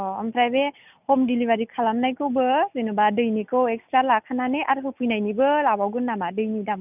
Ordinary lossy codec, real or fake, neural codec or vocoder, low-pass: none; real; none; 3.6 kHz